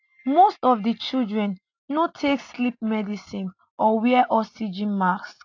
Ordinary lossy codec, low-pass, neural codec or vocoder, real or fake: AAC, 48 kbps; 7.2 kHz; none; real